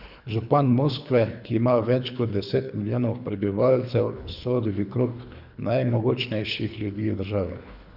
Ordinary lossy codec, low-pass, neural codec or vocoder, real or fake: none; 5.4 kHz; codec, 24 kHz, 3 kbps, HILCodec; fake